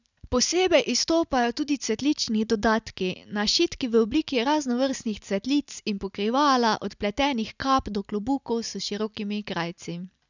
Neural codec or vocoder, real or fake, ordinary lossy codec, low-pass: none; real; none; 7.2 kHz